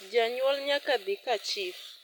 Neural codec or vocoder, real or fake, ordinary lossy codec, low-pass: none; real; none; none